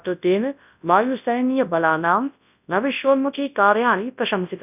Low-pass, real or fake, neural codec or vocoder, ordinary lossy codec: 3.6 kHz; fake; codec, 24 kHz, 0.9 kbps, WavTokenizer, large speech release; none